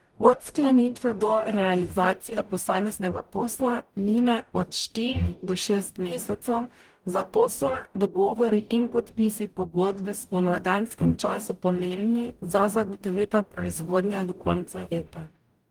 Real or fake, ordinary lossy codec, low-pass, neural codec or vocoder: fake; Opus, 32 kbps; 19.8 kHz; codec, 44.1 kHz, 0.9 kbps, DAC